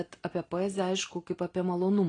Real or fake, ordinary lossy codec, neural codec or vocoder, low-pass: real; AAC, 32 kbps; none; 9.9 kHz